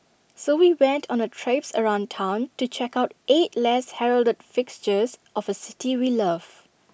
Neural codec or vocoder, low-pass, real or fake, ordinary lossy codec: none; none; real; none